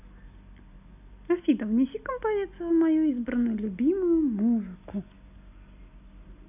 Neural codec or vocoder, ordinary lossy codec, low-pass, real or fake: none; none; 3.6 kHz; real